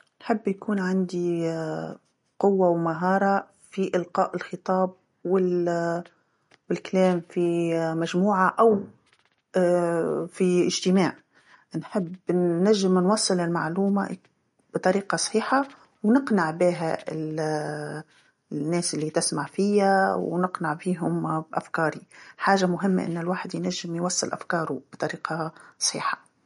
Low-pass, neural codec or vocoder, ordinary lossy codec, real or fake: 19.8 kHz; none; MP3, 48 kbps; real